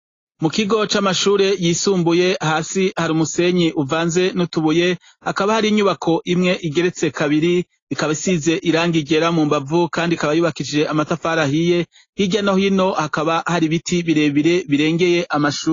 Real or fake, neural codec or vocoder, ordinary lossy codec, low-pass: real; none; AAC, 32 kbps; 7.2 kHz